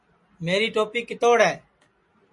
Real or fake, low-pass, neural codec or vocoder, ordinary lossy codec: real; 10.8 kHz; none; MP3, 48 kbps